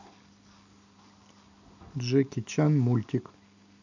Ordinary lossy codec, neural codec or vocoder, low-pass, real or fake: none; none; 7.2 kHz; real